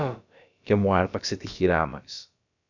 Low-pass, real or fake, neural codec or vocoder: 7.2 kHz; fake; codec, 16 kHz, about 1 kbps, DyCAST, with the encoder's durations